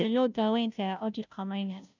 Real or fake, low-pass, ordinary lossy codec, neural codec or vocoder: fake; 7.2 kHz; none; codec, 16 kHz, 0.5 kbps, FunCodec, trained on Chinese and English, 25 frames a second